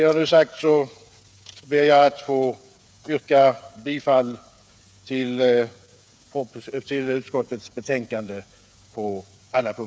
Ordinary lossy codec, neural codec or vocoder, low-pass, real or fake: none; codec, 16 kHz, 8 kbps, FreqCodec, smaller model; none; fake